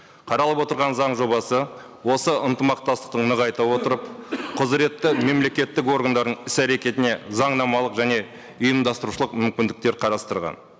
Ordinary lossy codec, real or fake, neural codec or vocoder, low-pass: none; real; none; none